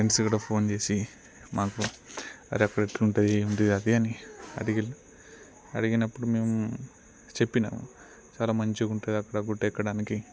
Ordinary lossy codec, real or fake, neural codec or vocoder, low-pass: none; real; none; none